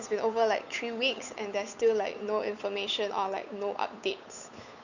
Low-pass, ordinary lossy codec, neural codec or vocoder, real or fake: 7.2 kHz; none; codec, 16 kHz, 8 kbps, FunCodec, trained on Chinese and English, 25 frames a second; fake